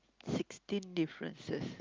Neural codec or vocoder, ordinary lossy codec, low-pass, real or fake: none; Opus, 24 kbps; 7.2 kHz; real